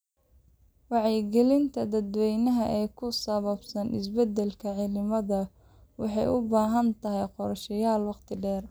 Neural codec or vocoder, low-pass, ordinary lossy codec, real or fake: none; none; none; real